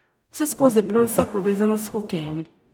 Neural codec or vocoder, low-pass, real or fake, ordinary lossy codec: codec, 44.1 kHz, 0.9 kbps, DAC; none; fake; none